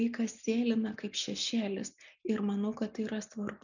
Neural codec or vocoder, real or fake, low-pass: none; real; 7.2 kHz